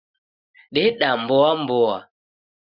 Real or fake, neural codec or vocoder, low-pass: real; none; 5.4 kHz